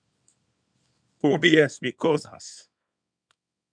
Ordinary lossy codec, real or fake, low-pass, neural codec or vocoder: MP3, 96 kbps; fake; 9.9 kHz; codec, 24 kHz, 0.9 kbps, WavTokenizer, small release